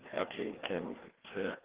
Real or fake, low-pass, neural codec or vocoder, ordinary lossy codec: fake; 3.6 kHz; codec, 16 kHz, 2 kbps, FreqCodec, larger model; Opus, 16 kbps